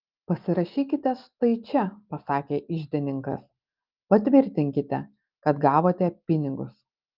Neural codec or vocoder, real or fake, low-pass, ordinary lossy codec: none; real; 5.4 kHz; Opus, 24 kbps